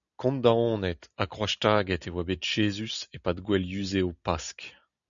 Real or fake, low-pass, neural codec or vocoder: real; 7.2 kHz; none